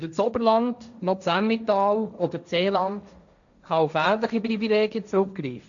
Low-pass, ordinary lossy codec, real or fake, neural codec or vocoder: 7.2 kHz; none; fake; codec, 16 kHz, 1.1 kbps, Voila-Tokenizer